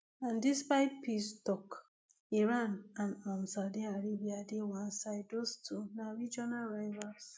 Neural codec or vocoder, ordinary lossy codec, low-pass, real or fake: none; none; none; real